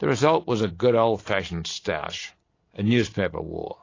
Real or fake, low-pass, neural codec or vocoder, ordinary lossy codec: real; 7.2 kHz; none; AAC, 32 kbps